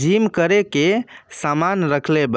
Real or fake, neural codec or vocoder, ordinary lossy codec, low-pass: real; none; none; none